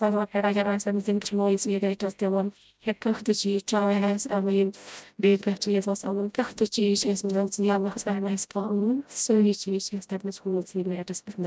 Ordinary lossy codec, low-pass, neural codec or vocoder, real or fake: none; none; codec, 16 kHz, 0.5 kbps, FreqCodec, smaller model; fake